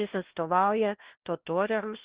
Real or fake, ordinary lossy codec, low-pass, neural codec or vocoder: fake; Opus, 16 kbps; 3.6 kHz; codec, 16 kHz, 1 kbps, FunCodec, trained on LibriTTS, 50 frames a second